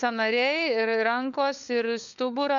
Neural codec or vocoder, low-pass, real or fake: codec, 16 kHz, 4 kbps, FunCodec, trained on LibriTTS, 50 frames a second; 7.2 kHz; fake